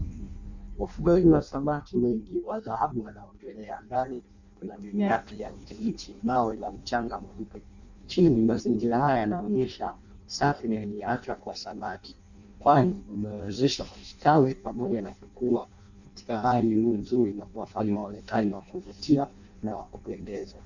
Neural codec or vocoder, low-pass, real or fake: codec, 16 kHz in and 24 kHz out, 0.6 kbps, FireRedTTS-2 codec; 7.2 kHz; fake